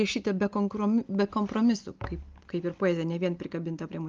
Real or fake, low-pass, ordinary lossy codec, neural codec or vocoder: real; 7.2 kHz; Opus, 32 kbps; none